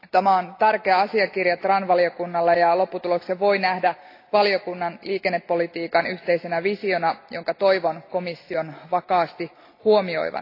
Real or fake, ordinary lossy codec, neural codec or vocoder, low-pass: real; AAC, 32 kbps; none; 5.4 kHz